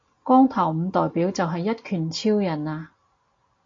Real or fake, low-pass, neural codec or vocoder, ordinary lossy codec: real; 7.2 kHz; none; AAC, 48 kbps